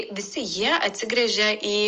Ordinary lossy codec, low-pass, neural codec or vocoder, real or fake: Opus, 24 kbps; 7.2 kHz; none; real